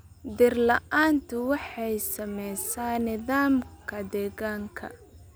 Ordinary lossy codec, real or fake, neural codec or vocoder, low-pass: none; real; none; none